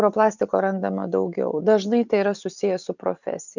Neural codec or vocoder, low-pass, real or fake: none; 7.2 kHz; real